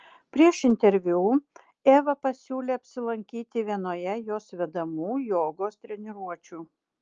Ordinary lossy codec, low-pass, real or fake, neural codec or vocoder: Opus, 24 kbps; 7.2 kHz; real; none